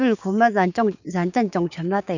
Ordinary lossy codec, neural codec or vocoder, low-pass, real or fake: none; codec, 16 kHz, 6 kbps, DAC; 7.2 kHz; fake